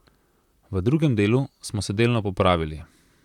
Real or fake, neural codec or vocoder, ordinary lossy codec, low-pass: fake; vocoder, 44.1 kHz, 128 mel bands, Pupu-Vocoder; none; 19.8 kHz